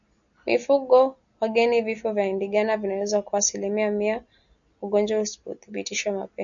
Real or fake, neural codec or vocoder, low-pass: real; none; 7.2 kHz